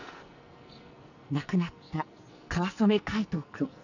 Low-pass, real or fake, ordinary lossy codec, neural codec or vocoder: 7.2 kHz; fake; none; codec, 44.1 kHz, 2.6 kbps, SNAC